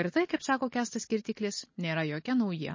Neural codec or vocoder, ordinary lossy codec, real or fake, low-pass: none; MP3, 32 kbps; real; 7.2 kHz